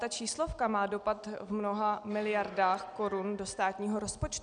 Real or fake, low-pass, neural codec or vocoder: real; 9.9 kHz; none